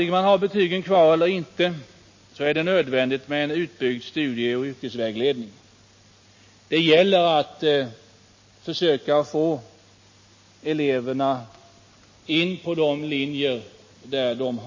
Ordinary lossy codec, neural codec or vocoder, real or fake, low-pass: MP3, 32 kbps; none; real; 7.2 kHz